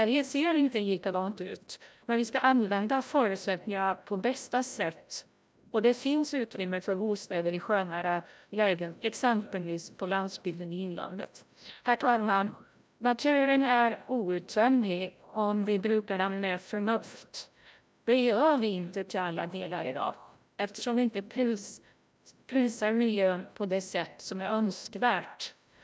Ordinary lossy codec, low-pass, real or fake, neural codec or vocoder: none; none; fake; codec, 16 kHz, 0.5 kbps, FreqCodec, larger model